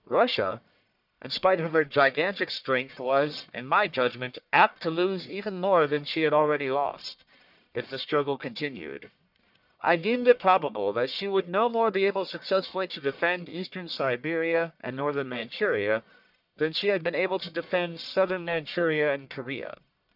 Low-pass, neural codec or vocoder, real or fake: 5.4 kHz; codec, 44.1 kHz, 1.7 kbps, Pupu-Codec; fake